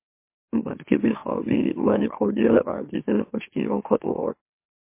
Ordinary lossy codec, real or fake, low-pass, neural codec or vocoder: MP3, 24 kbps; fake; 3.6 kHz; autoencoder, 44.1 kHz, a latent of 192 numbers a frame, MeloTTS